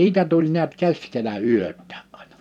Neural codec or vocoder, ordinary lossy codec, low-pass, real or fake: vocoder, 44.1 kHz, 128 mel bands every 256 samples, BigVGAN v2; none; 19.8 kHz; fake